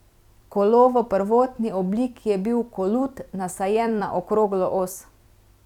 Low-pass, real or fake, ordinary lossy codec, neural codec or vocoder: 19.8 kHz; fake; none; vocoder, 44.1 kHz, 128 mel bands every 256 samples, BigVGAN v2